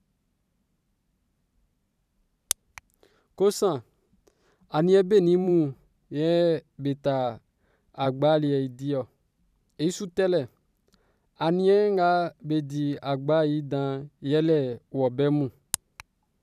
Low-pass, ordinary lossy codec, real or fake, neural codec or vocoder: 14.4 kHz; none; fake; vocoder, 44.1 kHz, 128 mel bands every 512 samples, BigVGAN v2